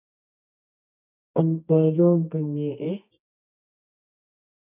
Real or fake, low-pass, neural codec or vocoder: fake; 3.6 kHz; codec, 24 kHz, 0.9 kbps, WavTokenizer, medium music audio release